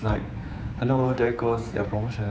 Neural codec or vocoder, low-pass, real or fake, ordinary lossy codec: codec, 16 kHz, 4 kbps, X-Codec, HuBERT features, trained on general audio; none; fake; none